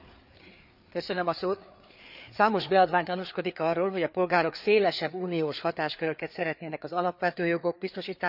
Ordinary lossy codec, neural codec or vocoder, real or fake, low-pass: none; codec, 16 kHz, 4 kbps, FreqCodec, larger model; fake; 5.4 kHz